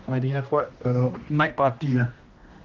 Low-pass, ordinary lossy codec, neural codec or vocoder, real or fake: 7.2 kHz; Opus, 32 kbps; codec, 16 kHz, 1 kbps, X-Codec, HuBERT features, trained on general audio; fake